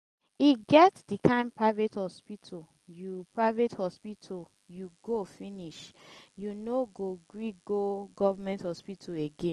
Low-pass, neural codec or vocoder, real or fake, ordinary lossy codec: 10.8 kHz; none; real; Opus, 24 kbps